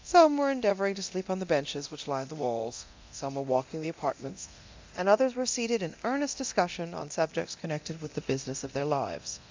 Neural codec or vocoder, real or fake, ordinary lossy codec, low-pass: codec, 24 kHz, 0.9 kbps, DualCodec; fake; MP3, 64 kbps; 7.2 kHz